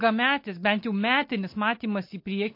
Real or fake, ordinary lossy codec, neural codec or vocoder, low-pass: real; MP3, 32 kbps; none; 5.4 kHz